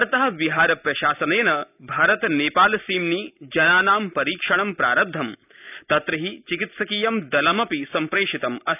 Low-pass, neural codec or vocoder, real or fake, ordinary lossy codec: 3.6 kHz; none; real; none